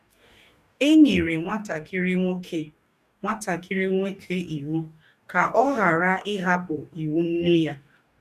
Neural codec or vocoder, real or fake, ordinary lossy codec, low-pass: codec, 44.1 kHz, 2.6 kbps, DAC; fake; none; 14.4 kHz